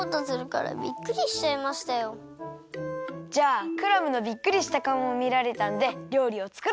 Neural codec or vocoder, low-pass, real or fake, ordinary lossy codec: none; none; real; none